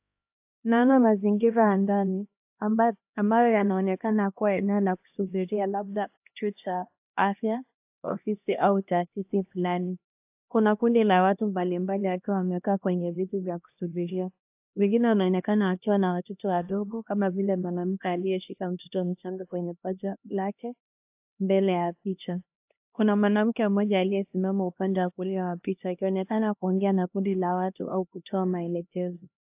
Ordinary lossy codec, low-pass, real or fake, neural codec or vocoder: AAC, 32 kbps; 3.6 kHz; fake; codec, 16 kHz, 1 kbps, X-Codec, HuBERT features, trained on LibriSpeech